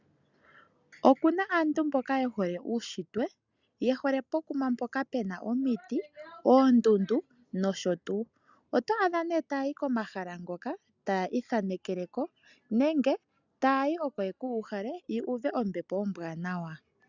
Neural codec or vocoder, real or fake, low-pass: none; real; 7.2 kHz